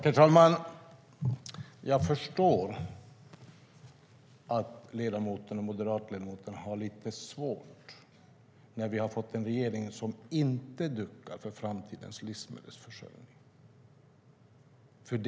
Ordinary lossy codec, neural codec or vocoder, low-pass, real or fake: none; none; none; real